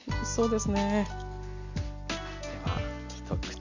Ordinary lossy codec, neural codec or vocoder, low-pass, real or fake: none; none; 7.2 kHz; real